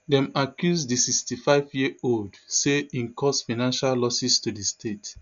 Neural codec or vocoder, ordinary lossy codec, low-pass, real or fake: none; none; 7.2 kHz; real